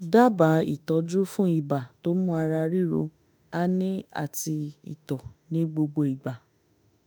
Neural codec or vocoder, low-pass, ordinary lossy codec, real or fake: autoencoder, 48 kHz, 32 numbers a frame, DAC-VAE, trained on Japanese speech; none; none; fake